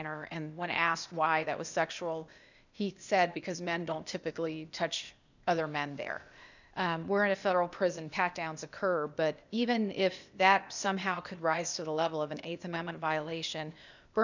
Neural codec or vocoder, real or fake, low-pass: codec, 16 kHz, 0.8 kbps, ZipCodec; fake; 7.2 kHz